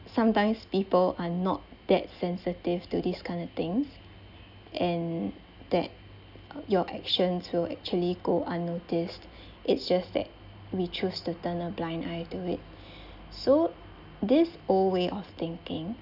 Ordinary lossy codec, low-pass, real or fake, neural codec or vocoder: none; 5.4 kHz; real; none